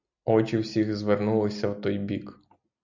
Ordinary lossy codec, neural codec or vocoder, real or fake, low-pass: MP3, 64 kbps; none; real; 7.2 kHz